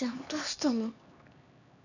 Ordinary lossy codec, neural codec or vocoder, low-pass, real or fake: none; codec, 16 kHz, 2 kbps, X-Codec, HuBERT features, trained on LibriSpeech; 7.2 kHz; fake